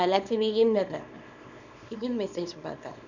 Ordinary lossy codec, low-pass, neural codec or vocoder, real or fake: none; 7.2 kHz; codec, 24 kHz, 0.9 kbps, WavTokenizer, small release; fake